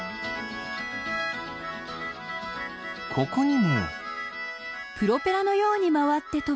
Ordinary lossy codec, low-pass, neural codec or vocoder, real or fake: none; none; none; real